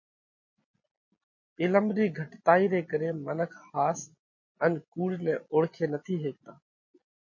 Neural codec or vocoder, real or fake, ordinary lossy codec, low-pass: none; real; MP3, 32 kbps; 7.2 kHz